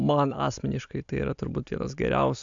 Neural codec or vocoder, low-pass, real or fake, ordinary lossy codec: none; 7.2 kHz; real; AAC, 64 kbps